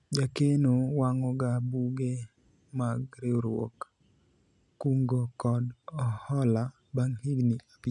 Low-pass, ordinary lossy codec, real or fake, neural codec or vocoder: 10.8 kHz; none; real; none